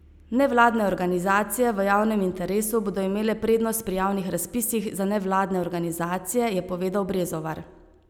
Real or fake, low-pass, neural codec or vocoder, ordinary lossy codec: real; none; none; none